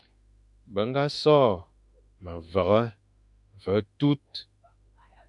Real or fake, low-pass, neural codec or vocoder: fake; 10.8 kHz; autoencoder, 48 kHz, 32 numbers a frame, DAC-VAE, trained on Japanese speech